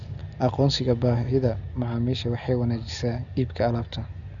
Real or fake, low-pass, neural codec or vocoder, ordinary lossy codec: real; 7.2 kHz; none; none